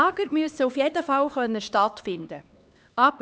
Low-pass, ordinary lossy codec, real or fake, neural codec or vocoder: none; none; fake; codec, 16 kHz, 2 kbps, X-Codec, HuBERT features, trained on LibriSpeech